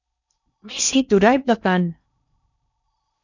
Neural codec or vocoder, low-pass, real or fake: codec, 16 kHz in and 24 kHz out, 0.6 kbps, FocalCodec, streaming, 4096 codes; 7.2 kHz; fake